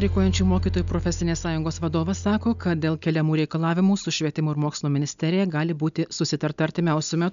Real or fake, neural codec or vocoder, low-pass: real; none; 7.2 kHz